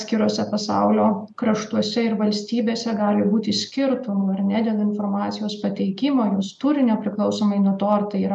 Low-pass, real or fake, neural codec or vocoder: 10.8 kHz; real; none